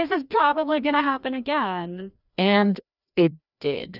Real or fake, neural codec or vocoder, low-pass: fake; codec, 16 kHz, 1 kbps, FreqCodec, larger model; 5.4 kHz